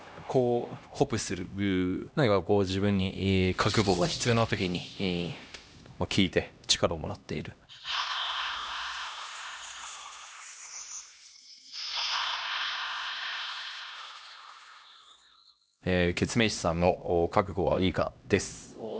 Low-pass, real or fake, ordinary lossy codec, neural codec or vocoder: none; fake; none; codec, 16 kHz, 1 kbps, X-Codec, HuBERT features, trained on LibriSpeech